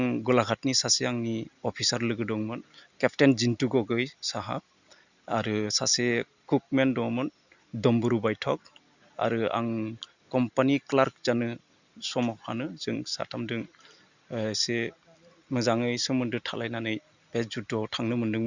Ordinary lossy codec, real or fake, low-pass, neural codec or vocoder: Opus, 64 kbps; real; 7.2 kHz; none